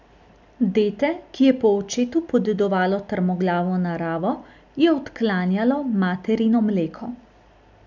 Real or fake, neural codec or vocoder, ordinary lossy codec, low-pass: real; none; none; 7.2 kHz